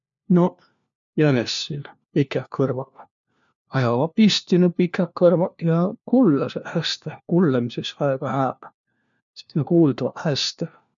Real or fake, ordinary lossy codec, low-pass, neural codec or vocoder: fake; MP3, 64 kbps; 7.2 kHz; codec, 16 kHz, 1 kbps, FunCodec, trained on LibriTTS, 50 frames a second